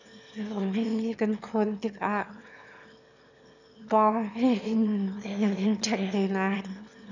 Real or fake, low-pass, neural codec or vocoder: fake; 7.2 kHz; autoencoder, 22.05 kHz, a latent of 192 numbers a frame, VITS, trained on one speaker